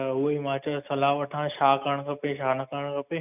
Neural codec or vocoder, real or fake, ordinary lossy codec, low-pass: none; real; none; 3.6 kHz